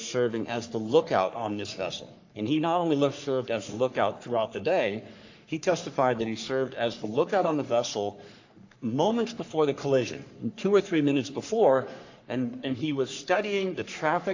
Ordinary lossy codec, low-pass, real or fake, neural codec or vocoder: AAC, 48 kbps; 7.2 kHz; fake; codec, 44.1 kHz, 3.4 kbps, Pupu-Codec